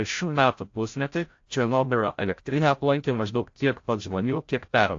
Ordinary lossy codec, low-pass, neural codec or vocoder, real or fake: AAC, 48 kbps; 7.2 kHz; codec, 16 kHz, 0.5 kbps, FreqCodec, larger model; fake